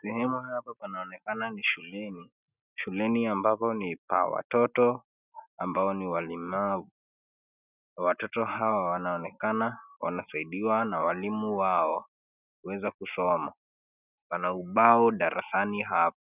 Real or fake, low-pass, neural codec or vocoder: real; 3.6 kHz; none